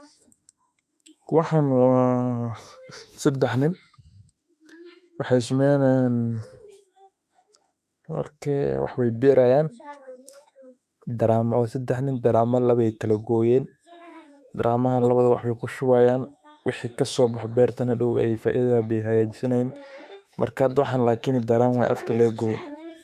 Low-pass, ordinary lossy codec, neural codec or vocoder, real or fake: 14.4 kHz; none; autoencoder, 48 kHz, 32 numbers a frame, DAC-VAE, trained on Japanese speech; fake